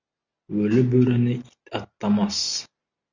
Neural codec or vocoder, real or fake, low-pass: none; real; 7.2 kHz